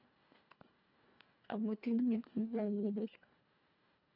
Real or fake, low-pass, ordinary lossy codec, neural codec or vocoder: fake; 5.4 kHz; none; codec, 24 kHz, 1.5 kbps, HILCodec